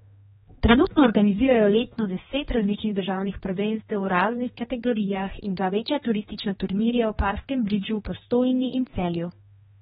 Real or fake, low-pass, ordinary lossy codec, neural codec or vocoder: fake; 7.2 kHz; AAC, 16 kbps; codec, 16 kHz, 2 kbps, X-Codec, HuBERT features, trained on general audio